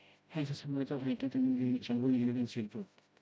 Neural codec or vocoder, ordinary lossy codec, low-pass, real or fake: codec, 16 kHz, 0.5 kbps, FreqCodec, smaller model; none; none; fake